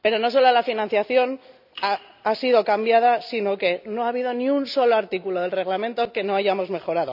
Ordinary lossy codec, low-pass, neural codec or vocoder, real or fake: none; 5.4 kHz; none; real